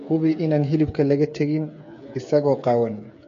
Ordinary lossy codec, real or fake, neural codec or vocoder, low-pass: MP3, 48 kbps; fake; codec, 16 kHz, 8 kbps, FreqCodec, smaller model; 7.2 kHz